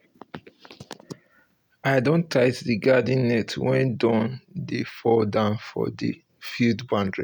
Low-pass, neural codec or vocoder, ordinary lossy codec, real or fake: none; none; none; real